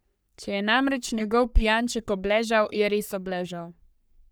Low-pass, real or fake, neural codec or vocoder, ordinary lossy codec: none; fake; codec, 44.1 kHz, 3.4 kbps, Pupu-Codec; none